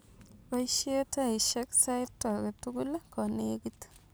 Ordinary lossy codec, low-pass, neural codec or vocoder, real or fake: none; none; none; real